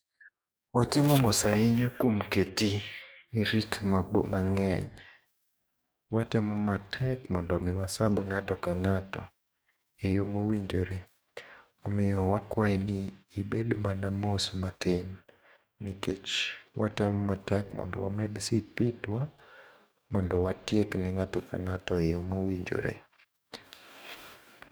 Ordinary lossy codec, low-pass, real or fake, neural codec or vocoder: none; none; fake; codec, 44.1 kHz, 2.6 kbps, DAC